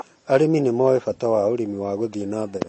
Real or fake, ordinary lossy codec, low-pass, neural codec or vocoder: real; MP3, 32 kbps; 10.8 kHz; none